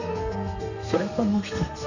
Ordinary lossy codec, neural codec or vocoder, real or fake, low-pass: none; codec, 32 kHz, 1.9 kbps, SNAC; fake; 7.2 kHz